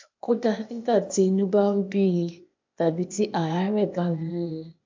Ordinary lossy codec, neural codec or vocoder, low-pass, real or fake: MP3, 64 kbps; codec, 16 kHz, 0.8 kbps, ZipCodec; 7.2 kHz; fake